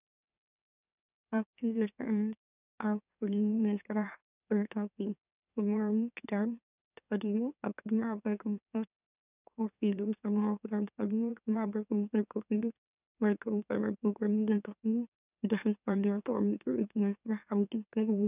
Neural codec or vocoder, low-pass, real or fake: autoencoder, 44.1 kHz, a latent of 192 numbers a frame, MeloTTS; 3.6 kHz; fake